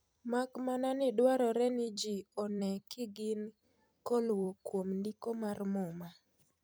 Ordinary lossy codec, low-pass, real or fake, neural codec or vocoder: none; none; fake; vocoder, 44.1 kHz, 128 mel bands every 256 samples, BigVGAN v2